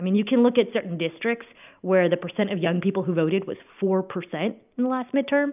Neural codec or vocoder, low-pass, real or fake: none; 3.6 kHz; real